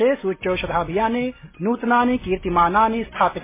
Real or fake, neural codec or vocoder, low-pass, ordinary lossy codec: real; none; 3.6 kHz; MP3, 16 kbps